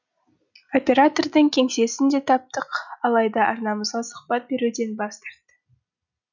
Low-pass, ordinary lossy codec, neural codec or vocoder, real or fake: 7.2 kHz; none; none; real